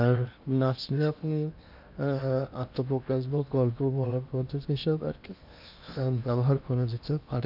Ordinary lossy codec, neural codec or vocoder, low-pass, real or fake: none; codec, 16 kHz in and 24 kHz out, 0.8 kbps, FocalCodec, streaming, 65536 codes; 5.4 kHz; fake